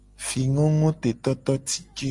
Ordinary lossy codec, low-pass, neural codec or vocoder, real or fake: Opus, 32 kbps; 10.8 kHz; none; real